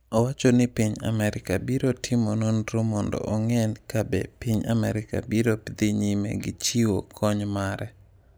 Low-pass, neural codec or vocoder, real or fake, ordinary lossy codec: none; none; real; none